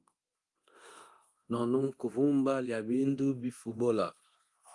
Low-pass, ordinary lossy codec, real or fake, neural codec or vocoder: 10.8 kHz; Opus, 24 kbps; fake; codec, 24 kHz, 0.9 kbps, DualCodec